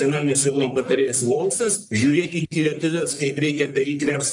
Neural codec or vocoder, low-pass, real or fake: codec, 44.1 kHz, 1.7 kbps, Pupu-Codec; 10.8 kHz; fake